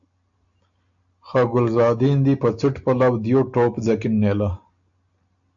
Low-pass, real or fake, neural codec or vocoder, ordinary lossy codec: 7.2 kHz; real; none; AAC, 64 kbps